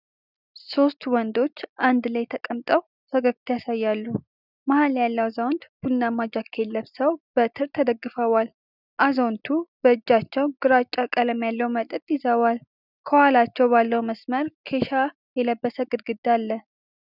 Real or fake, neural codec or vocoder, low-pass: real; none; 5.4 kHz